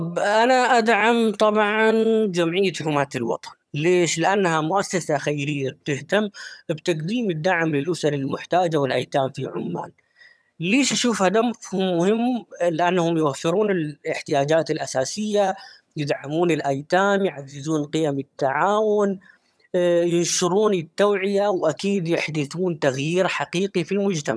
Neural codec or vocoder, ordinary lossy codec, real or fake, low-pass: vocoder, 22.05 kHz, 80 mel bands, HiFi-GAN; none; fake; none